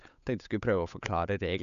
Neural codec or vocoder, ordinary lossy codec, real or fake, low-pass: codec, 16 kHz, 8 kbps, FunCodec, trained on Chinese and English, 25 frames a second; none; fake; 7.2 kHz